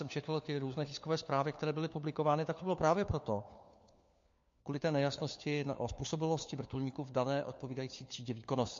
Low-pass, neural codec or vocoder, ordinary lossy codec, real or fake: 7.2 kHz; codec, 16 kHz, 4 kbps, FunCodec, trained on LibriTTS, 50 frames a second; MP3, 48 kbps; fake